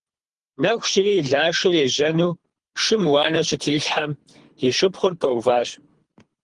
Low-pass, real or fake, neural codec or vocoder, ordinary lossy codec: 10.8 kHz; fake; codec, 24 kHz, 3 kbps, HILCodec; Opus, 24 kbps